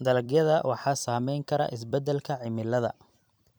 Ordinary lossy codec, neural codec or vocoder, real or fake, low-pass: none; vocoder, 44.1 kHz, 128 mel bands every 256 samples, BigVGAN v2; fake; none